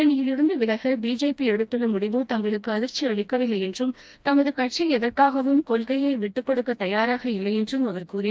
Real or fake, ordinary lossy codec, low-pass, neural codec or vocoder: fake; none; none; codec, 16 kHz, 1 kbps, FreqCodec, smaller model